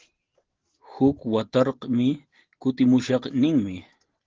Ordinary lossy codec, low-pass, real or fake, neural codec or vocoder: Opus, 16 kbps; 7.2 kHz; real; none